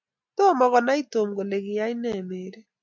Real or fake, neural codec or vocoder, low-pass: real; none; 7.2 kHz